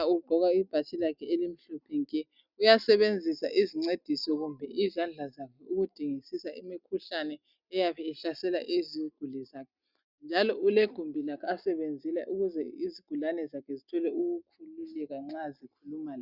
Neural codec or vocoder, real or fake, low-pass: none; real; 5.4 kHz